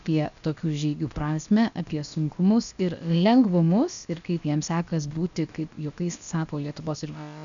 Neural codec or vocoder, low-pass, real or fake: codec, 16 kHz, about 1 kbps, DyCAST, with the encoder's durations; 7.2 kHz; fake